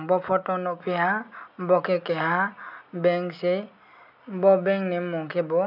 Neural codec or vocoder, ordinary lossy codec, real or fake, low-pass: none; none; real; 5.4 kHz